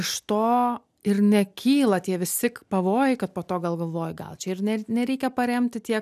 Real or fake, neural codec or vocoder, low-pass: real; none; 14.4 kHz